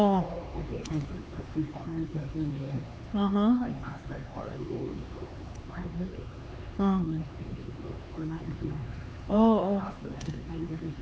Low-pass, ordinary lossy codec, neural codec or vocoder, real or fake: none; none; codec, 16 kHz, 4 kbps, X-Codec, HuBERT features, trained on LibriSpeech; fake